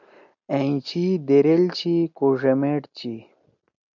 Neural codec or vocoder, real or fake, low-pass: none; real; 7.2 kHz